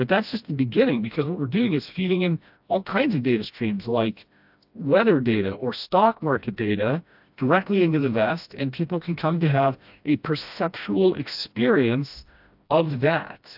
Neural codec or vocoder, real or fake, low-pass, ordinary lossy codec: codec, 16 kHz, 1 kbps, FreqCodec, smaller model; fake; 5.4 kHz; AAC, 48 kbps